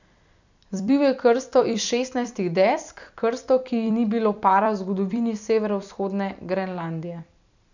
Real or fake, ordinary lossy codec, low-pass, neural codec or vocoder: fake; none; 7.2 kHz; vocoder, 44.1 kHz, 128 mel bands every 256 samples, BigVGAN v2